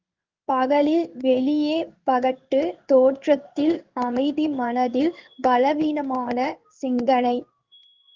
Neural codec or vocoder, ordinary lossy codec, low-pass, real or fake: codec, 16 kHz in and 24 kHz out, 1 kbps, XY-Tokenizer; Opus, 32 kbps; 7.2 kHz; fake